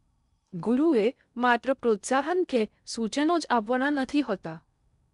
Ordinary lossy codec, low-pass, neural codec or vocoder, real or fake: none; 10.8 kHz; codec, 16 kHz in and 24 kHz out, 0.6 kbps, FocalCodec, streaming, 4096 codes; fake